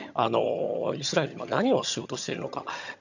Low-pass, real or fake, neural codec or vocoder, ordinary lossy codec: 7.2 kHz; fake; vocoder, 22.05 kHz, 80 mel bands, HiFi-GAN; none